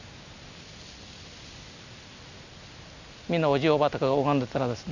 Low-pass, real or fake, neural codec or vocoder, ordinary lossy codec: 7.2 kHz; real; none; none